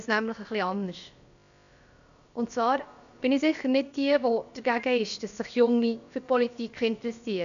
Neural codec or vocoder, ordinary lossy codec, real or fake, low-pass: codec, 16 kHz, about 1 kbps, DyCAST, with the encoder's durations; none; fake; 7.2 kHz